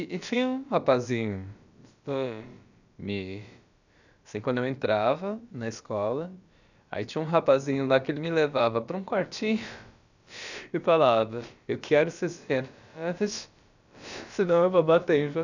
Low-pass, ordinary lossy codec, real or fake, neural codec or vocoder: 7.2 kHz; none; fake; codec, 16 kHz, about 1 kbps, DyCAST, with the encoder's durations